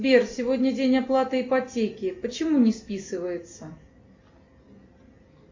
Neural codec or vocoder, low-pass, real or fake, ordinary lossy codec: none; 7.2 kHz; real; MP3, 64 kbps